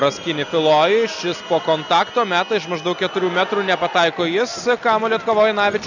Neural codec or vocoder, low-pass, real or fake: none; 7.2 kHz; real